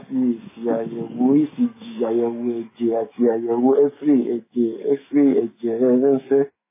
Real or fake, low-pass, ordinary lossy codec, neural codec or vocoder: fake; 3.6 kHz; MP3, 16 kbps; autoencoder, 48 kHz, 128 numbers a frame, DAC-VAE, trained on Japanese speech